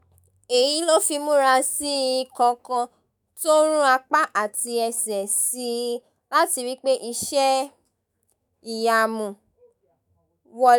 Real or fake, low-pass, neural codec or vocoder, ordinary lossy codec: fake; none; autoencoder, 48 kHz, 128 numbers a frame, DAC-VAE, trained on Japanese speech; none